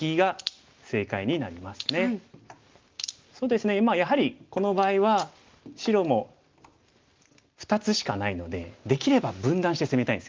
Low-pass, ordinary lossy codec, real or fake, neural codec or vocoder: 7.2 kHz; Opus, 32 kbps; real; none